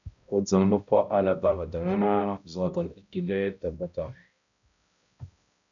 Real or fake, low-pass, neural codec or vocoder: fake; 7.2 kHz; codec, 16 kHz, 0.5 kbps, X-Codec, HuBERT features, trained on balanced general audio